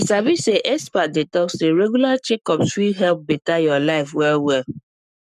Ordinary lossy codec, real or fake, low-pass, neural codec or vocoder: none; fake; 14.4 kHz; codec, 44.1 kHz, 7.8 kbps, Pupu-Codec